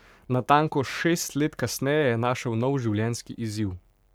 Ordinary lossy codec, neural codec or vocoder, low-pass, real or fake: none; codec, 44.1 kHz, 7.8 kbps, Pupu-Codec; none; fake